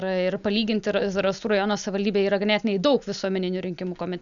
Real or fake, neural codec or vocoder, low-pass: real; none; 7.2 kHz